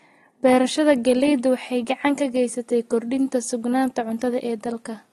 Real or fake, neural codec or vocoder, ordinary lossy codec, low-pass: real; none; AAC, 32 kbps; 19.8 kHz